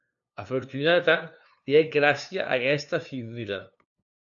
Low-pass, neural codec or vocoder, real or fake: 7.2 kHz; codec, 16 kHz, 2 kbps, FunCodec, trained on LibriTTS, 25 frames a second; fake